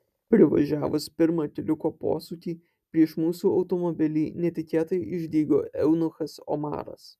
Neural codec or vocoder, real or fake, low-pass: none; real; 14.4 kHz